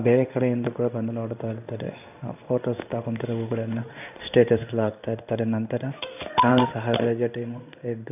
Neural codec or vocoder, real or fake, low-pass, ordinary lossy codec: codec, 16 kHz in and 24 kHz out, 1 kbps, XY-Tokenizer; fake; 3.6 kHz; none